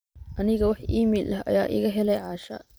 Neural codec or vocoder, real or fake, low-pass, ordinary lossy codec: vocoder, 44.1 kHz, 128 mel bands every 512 samples, BigVGAN v2; fake; none; none